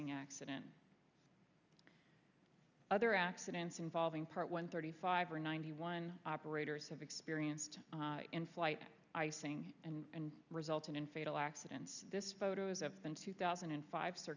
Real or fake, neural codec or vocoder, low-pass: real; none; 7.2 kHz